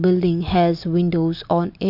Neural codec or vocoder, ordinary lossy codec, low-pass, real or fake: none; none; 5.4 kHz; real